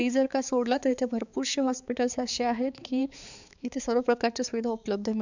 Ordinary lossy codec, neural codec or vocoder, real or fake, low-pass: none; codec, 16 kHz, 4 kbps, X-Codec, HuBERT features, trained on balanced general audio; fake; 7.2 kHz